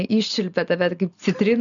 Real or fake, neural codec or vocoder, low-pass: real; none; 7.2 kHz